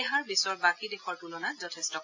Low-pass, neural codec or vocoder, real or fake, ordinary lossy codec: 7.2 kHz; none; real; AAC, 48 kbps